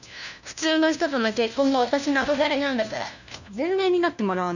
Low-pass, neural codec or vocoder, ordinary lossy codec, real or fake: 7.2 kHz; codec, 16 kHz, 1 kbps, FunCodec, trained on LibriTTS, 50 frames a second; none; fake